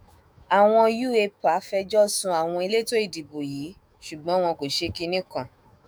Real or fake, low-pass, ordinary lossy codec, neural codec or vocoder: fake; none; none; autoencoder, 48 kHz, 128 numbers a frame, DAC-VAE, trained on Japanese speech